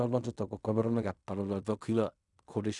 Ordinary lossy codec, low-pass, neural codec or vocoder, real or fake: none; 10.8 kHz; codec, 16 kHz in and 24 kHz out, 0.4 kbps, LongCat-Audio-Codec, fine tuned four codebook decoder; fake